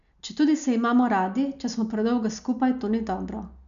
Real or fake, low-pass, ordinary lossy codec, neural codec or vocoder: real; 7.2 kHz; none; none